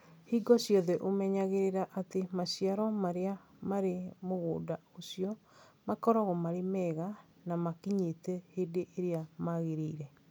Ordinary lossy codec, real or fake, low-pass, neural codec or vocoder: none; real; none; none